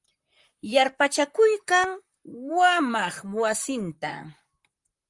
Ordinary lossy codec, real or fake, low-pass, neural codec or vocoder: Opus, 32 kbps; fake; 10.8 kHz; vocoder, 44.1 kHz, 128 mel bands, Pupu-Vocoder